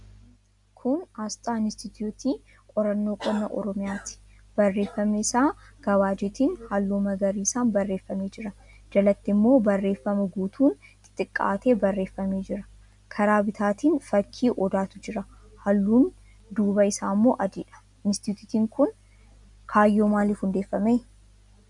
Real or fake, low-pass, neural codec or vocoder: real; 10.8 kHz; none